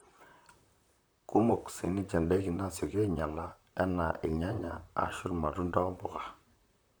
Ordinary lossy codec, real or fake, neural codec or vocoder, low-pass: none; fake; vocoder, 44.1 kHz, 128 mel bands, Pupu-Vocoder; none